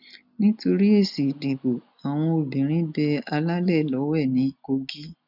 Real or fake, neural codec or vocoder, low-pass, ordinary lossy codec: real; none; 5.4 kHz; none